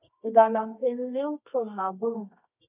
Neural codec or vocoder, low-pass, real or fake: codec, 24 kHz, 0.9 kbps, WavTokenizer, medium music audio release; 3.6 kHz; fake